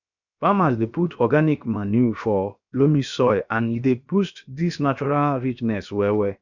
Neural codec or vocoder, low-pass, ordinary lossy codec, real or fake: codec, 16 kHz, 0.7 kbps, FocalCodec; 7.2 kHz; none; fake